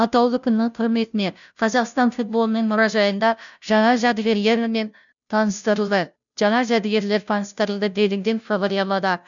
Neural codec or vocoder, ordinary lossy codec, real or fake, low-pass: codec, 16 kHz, 0.5 kbps, FunCodec, trained on Chinese and English, 25 frames a second; none; fake; 7.2 kHz